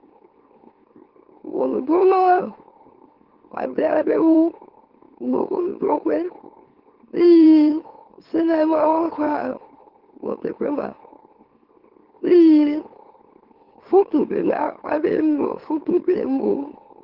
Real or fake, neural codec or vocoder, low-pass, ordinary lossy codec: fake; autoencoder, 44.1 kHz, a latent of 192 numbers a frame, MeloTTS; 5.4 kHz; Opus, 16 kbps